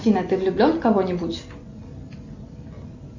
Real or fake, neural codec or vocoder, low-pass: real; none; 7.2 kHz